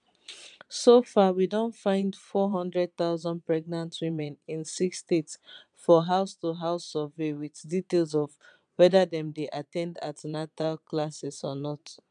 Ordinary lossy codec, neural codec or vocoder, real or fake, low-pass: none; vocoder, 22.05 kHz, 80 mel bands, Vocos; fake; 9.9 kHz